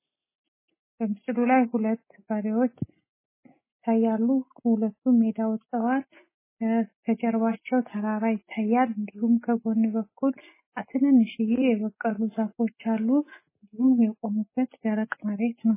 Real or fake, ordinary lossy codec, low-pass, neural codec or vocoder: real; MP3, 16 kbps; 3.6 kHz; none